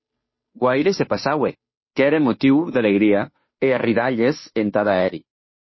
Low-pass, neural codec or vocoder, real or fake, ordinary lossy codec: 7.2 kHz; codec, 16 kHz, 2 kbps, FunCodec, trained on Chinese and English, 25 frames a second; fake; MP3, 24 kbps